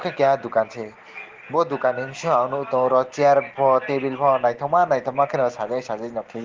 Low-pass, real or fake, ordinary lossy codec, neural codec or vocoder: 7.2 kHz; real; Opus, 16 kbps; none